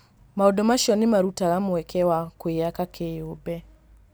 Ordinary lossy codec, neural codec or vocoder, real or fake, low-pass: none; none; real; none